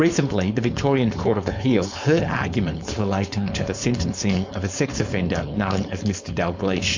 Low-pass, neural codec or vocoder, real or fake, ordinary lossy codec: 7.2 kHz; codec, 16 kHz, 4.8 kbps, FACodec; fake; MP3, 64 kbps